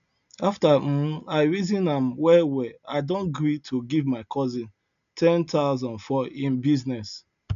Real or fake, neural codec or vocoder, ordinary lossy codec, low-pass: real; none; none; 7.2 kHz